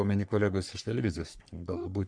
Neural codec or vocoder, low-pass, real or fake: codec, 44.1 kHz, 3.4 kbps, Pupu-Codec; 9.9 kHz; fake